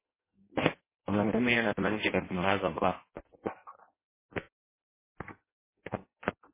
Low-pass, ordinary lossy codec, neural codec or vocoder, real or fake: 3.6 kHz; MP3, 16 kbps; codec, 16 kHz in and 24 kHz out, 0.6 kbps, FireRedTTS-2 codec; fake